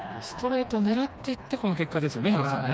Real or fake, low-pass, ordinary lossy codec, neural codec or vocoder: fake; none; none; codec, 16 kHz, 2 kbps, FreqCodec, smaller model